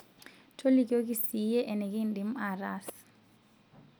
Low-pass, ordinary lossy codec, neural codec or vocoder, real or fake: none; none; none; real